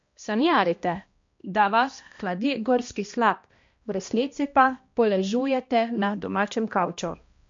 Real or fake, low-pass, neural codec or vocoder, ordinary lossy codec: fake; 7.2 kHz; codec, 16 kHz, 1 kbps, X-Codec, HuBERT features, trained on balanced general audio; MP3, 48 kbps